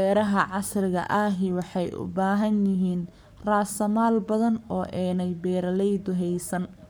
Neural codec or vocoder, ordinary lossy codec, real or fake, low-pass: codec, 44.1 kHz, 7.8 kbps, Pupu-Codec; none; fake; none